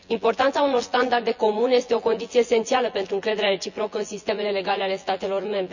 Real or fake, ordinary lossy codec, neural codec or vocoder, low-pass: fake; none; vocoder, 24 kHz, 100 mel bands, Vocos; 7.2 kHz